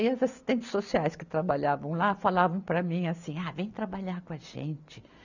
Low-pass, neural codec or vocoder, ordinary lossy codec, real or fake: 7.2 kHz; none; none; real